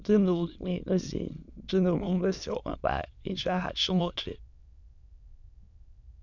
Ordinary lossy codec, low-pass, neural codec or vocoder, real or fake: none; 7.2 kHz; autoencoder, 22.05 kHz, a latent of 192 numbers a frame, VITS, trained on many speakers; fake